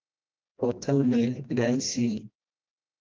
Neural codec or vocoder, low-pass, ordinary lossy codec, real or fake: codec, 16 kHz, 1 kbps, FreqCodec, smaller model; 7.2 kHz; Opus, 32 kbps; fake